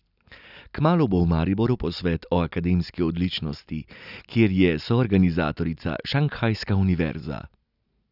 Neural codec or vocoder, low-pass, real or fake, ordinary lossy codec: none; 5.4 kHz; real; none